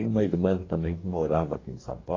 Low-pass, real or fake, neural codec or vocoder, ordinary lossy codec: 7.2 kHz; fake; codec, 44.1 kHz, 2.6 kbps, DAC; AAC, 32 kbps